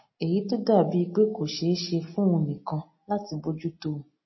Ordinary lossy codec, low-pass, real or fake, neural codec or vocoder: MP3, 24 kbps; 7.2 kHz; real; none